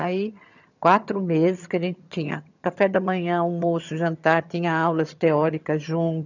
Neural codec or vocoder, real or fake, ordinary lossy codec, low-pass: vocoder, 22.05 kHz, 80 mel bands, HiFi-GAN; fake; none; 7.2 kHz